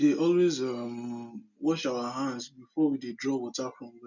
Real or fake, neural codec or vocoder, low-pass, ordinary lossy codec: real; none; 7.2 kHz; none